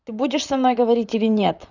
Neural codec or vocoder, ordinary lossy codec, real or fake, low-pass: vocoder, 44.1 kHz, 128 mel bands, Pupu-Vocoder; none; fake; 7.2 kHz